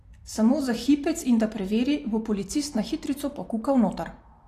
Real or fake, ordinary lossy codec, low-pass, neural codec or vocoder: fake; AAC, 48 kbps; 14.4 kHz; vocoder, 44.1 kHz, 128 mel bands every 256 samples, BigVGAN v2